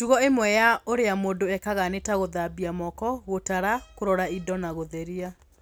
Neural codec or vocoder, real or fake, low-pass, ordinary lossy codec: none; real; none; none